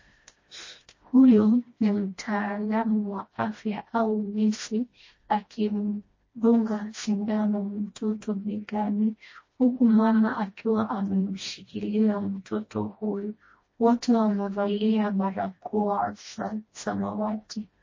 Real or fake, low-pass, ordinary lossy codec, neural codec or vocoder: fake; 7.2 kHz; MP3, 32 kbps; codec, 16 kHz, 1 kbps, FreqCodec, smaller model